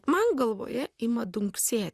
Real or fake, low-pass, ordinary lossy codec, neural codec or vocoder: fake; 14.4 kHz; Opus, 64 kbps; vocoder, 44.1 kHz, 128 mel bands, Pupu-Vocoder